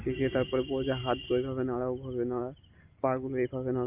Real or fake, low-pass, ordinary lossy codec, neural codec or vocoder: real; 3.6 kHz; Opus, 32 kbps; none